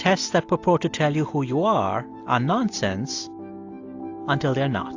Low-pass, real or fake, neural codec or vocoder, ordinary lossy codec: 7.2 kHz; real; none; AAC, 48 kbps